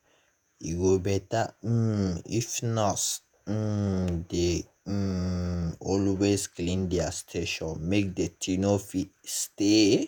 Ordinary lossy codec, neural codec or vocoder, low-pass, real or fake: none; vocoder, 48 kHz, 128 mel bands, Vocos; none; fake